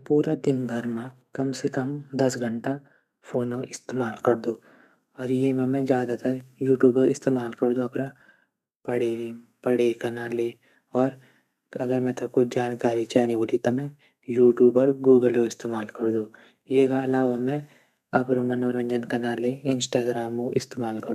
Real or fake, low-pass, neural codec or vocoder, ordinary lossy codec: fake; 14.4 kHz; codec, 32 kHz, 1.9 kbps, SNAC; none